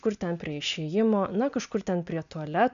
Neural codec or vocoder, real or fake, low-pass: none; real; 7.2 kHz